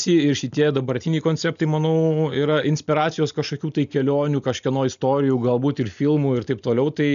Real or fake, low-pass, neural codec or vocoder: real; 7.2 kHz; none